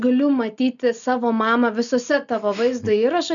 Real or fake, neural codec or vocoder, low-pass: real; none; 7.2 kHz